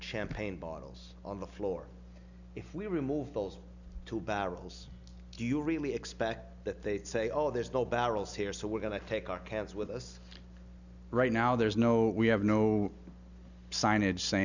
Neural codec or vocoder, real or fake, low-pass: none; real; 7.2 kHz